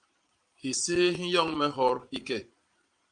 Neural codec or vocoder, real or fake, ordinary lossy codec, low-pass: vocoder, 22.05 kHz, 80 mel bands, Vocos; fake; Opus, 32 kbps; 9.9 kHz